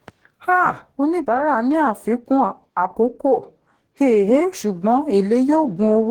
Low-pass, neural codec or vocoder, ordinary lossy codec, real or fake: 19.8 kHz; codec, 44.1 kHz, 2.6 kbps, DAC; Opus, 16 kbps; fake